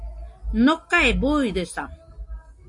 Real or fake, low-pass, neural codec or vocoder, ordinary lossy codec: real; 10.8 kHz; none; AAC, 64 kbps